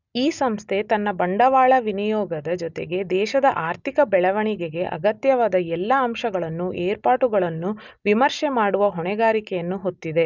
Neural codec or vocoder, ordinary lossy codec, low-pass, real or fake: none; none; 7.2 kHz; real